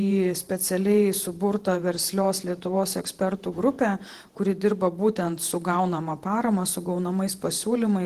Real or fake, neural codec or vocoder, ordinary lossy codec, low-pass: fake; vocoder, 48 kHz, 128 mel bands, Vocos; Opus, 16 kbps; 14.4 kHz